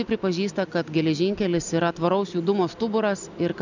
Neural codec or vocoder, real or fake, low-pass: none; real; 7.2 kHz